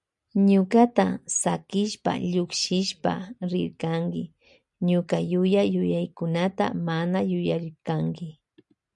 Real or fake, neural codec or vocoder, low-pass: real; none; 10.8 kHz